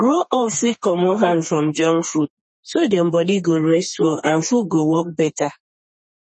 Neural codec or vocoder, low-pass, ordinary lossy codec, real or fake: codec, 32 kHz, 1.9 kbps, SNAC; 10.8 kHz; MP3, 32 kbps; fake